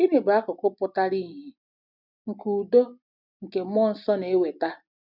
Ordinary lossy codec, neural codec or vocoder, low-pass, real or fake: none; none; 5.4 kHz; real